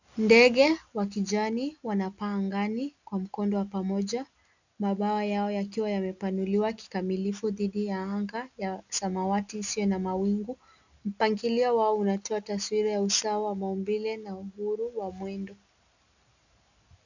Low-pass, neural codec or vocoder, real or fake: 7.2 kHz; none; real